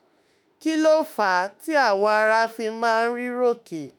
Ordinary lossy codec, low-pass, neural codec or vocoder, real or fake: none; none; autoencoder, 48 kHz, 32 numbers a frame, DAC-VAE, trained on Japanese speech; fake